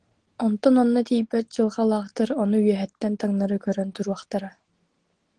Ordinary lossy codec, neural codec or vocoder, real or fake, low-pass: Opus, 16 kbps; none; real; 9.9 kHz